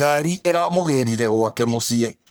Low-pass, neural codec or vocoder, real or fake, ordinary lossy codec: none; codec, 44.1 kHz, 1.7 kbps, Pupu-Codec; fake; none